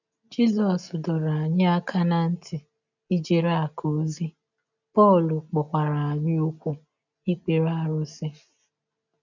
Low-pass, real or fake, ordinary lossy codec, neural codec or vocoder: 7.2 kHz; real; none; none